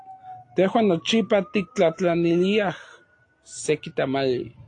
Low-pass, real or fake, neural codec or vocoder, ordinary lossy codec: 9.9 kHz; real; none; AAC, 48 kbps